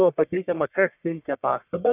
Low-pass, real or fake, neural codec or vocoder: 3.6 kHz; fake; codec, 44.1 kHz, 1.7 kbps, Pupu-Codec